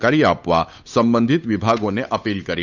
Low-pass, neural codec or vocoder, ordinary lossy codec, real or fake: 7.2 kHz; codec, 16 kHz, 8 kbps, FunCodec, trained on Chinese and English, 25 frames a second; none; fake